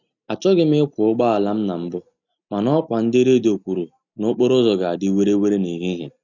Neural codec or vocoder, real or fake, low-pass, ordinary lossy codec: none; real; 7.2 kHz; none